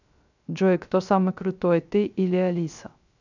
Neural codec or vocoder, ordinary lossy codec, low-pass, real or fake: codec, 16 kHz, 0.3 kbps, FocalCodec; none; 7.2 kHz; fake